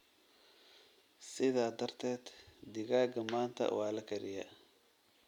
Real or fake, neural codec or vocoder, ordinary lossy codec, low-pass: real; none; none; 19.8 kHz